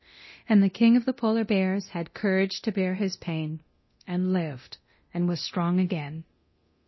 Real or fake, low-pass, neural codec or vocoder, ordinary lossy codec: fake; 7.2 kHz; codec, 24 kHz, 0.9 kbps, DualCodec; MP3, 24 kbps